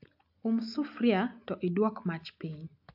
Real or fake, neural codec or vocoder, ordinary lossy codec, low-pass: real; none; AAC, 48 kbps; 5.4 kHz